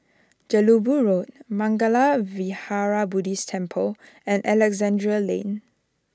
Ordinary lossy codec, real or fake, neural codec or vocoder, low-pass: none; real; none; none